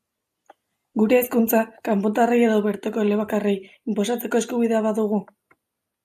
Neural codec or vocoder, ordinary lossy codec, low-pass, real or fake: none; AAC, 96 kbps; 14.4 kHz; real